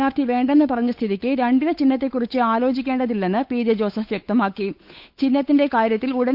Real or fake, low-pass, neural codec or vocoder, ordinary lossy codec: fake; 5.4 kHz; codec, 16 kHz, 8 kbps, FunCodec, trained on Chinese and English, 25 frames a second; Opus, 64 kbps